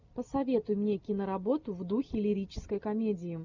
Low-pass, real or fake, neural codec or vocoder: 7.2 kHz; real; none